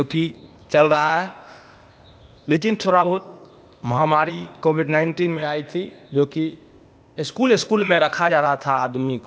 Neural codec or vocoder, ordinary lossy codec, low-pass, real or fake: codec, 16 kHz, 0.8 kbps, ZipCodec; none; none; fake